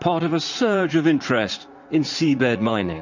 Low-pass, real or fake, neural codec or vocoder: 7.2 kHz; fake; vocoder, 44.1 kHz, 80 mel bands, Vocos